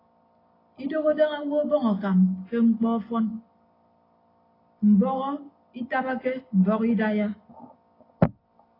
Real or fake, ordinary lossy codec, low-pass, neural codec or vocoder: real; AAC, 24 kbps; 5.4 kHz; none